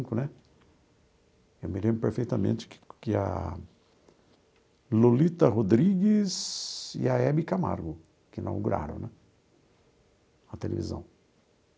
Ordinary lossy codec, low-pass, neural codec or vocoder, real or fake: none; none; none; real